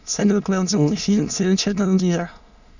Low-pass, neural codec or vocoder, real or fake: 7.2 kHz; autoencoder, 22.05 kHz, a latent of 192 numbers a frame, VITS, trained on many speakers; fake